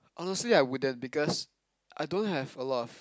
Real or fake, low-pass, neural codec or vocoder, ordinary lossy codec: real; none; none; none